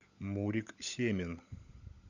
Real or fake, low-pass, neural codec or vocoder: fake; 7.2 kHz; codec, 16 kHz, 16 kbps, FunCodec, trained on LibriTTS, 50 frames a second